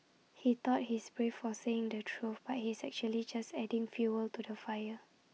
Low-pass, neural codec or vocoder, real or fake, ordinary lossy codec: none; none; real; none